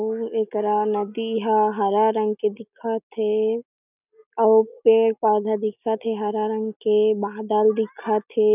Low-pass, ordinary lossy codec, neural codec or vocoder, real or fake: 3.6 kHz; none; none; real